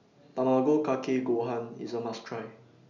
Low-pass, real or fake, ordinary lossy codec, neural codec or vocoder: 7.2 kHz; real; none; none